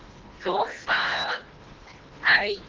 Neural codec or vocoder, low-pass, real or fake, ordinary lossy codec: codec, 24 kHz, 1.5 kbps, HILCodec; 7.2 kHz; fake; Opus, 16 kbps